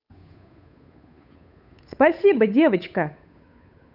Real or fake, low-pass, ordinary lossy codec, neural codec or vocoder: fake; 5.4 kHz; none; codec, 16 kHz, 8 kbps, FunCodec, trained on Chinese and English, 25 frames a second